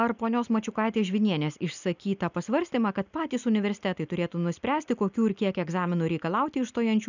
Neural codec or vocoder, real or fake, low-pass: none; real; 7.2 kHz